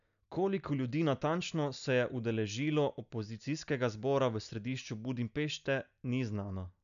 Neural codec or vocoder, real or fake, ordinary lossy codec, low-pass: none; real; none; 7.2 kHz